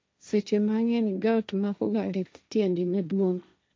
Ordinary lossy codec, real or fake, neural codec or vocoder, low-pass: none; fake; codec, 16 kHz, 1.1 kbps, Voila-Tokenizer; none